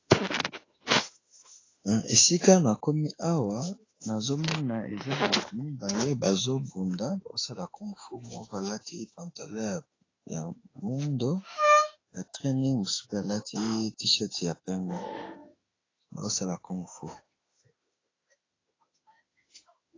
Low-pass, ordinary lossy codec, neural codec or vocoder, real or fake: 7.2 kHz; AAC, 32 kbps; autoencoder, 48 kHz, 32 numbers a frame, DAC-VAE, trained on Japanese speech; fake